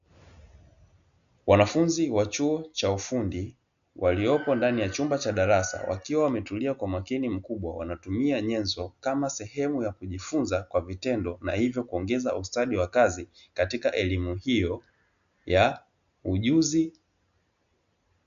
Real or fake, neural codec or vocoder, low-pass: real; none; 7.2 kHz